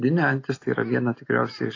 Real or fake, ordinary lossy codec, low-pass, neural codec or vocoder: real; AAC, 32 kbps; 7.2 kHz; none